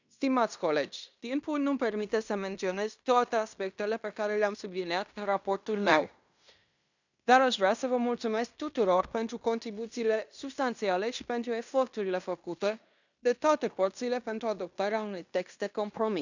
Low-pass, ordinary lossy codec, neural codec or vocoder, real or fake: 7.2 kHz; none; codec, 16 kHz in and 24 kHz out, 0.9 kbps, LongCat-Audio-Codec, fine tuned four codebook decoder; fake